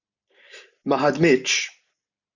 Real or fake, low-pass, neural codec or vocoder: real; 7.2 kHz; none